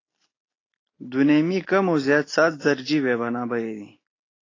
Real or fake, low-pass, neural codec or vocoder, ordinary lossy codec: real; 7.2 kHz; none; AAC, 32 kbps